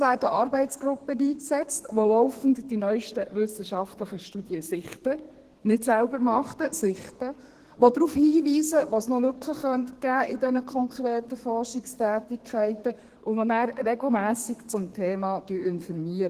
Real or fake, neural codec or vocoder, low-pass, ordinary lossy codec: fake; codec, 32 kHz, 1.9 kbps, SNAC; 14.4 kHz; Opus, 16 kbps